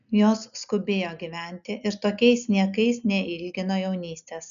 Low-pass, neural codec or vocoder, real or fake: 7.2 kHz; none; real